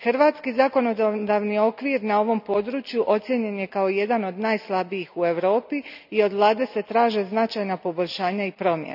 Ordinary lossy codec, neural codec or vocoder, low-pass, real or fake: none; none; 5.4 kHz; real